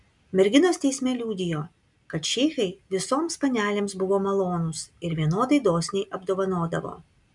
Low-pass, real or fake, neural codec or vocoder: 10.8 kHz; real; none